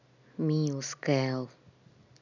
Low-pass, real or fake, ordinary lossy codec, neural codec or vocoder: 7.2 kHz; real; none; none